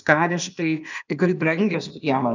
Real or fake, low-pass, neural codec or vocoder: fake; 7.2 kHz; codec, 16 kHz, 0.8 kbps, ZipCodec